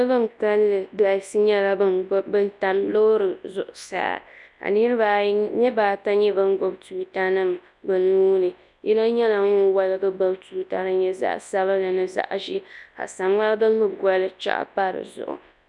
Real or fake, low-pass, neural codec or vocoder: fake; 10.8 kHz; codec, 24 kHz, 0.9 kbps, WavTokenizer, large speech release